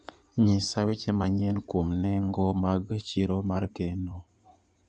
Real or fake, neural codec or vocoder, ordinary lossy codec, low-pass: fake; codec, 16 kHz in and 24 kHz out, 2.2 kbps, FireRedTTS-2 codec; none; 9.9 kHz